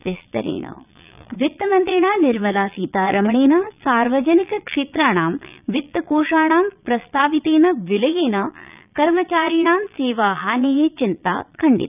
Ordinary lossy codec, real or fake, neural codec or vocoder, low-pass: none; fake; vocoder, 22.05 kHz, 80 mel bands, Vocos; 3.6 kHz